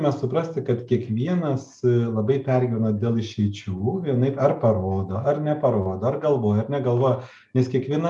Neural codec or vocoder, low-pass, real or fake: none; 10.8 kHz; real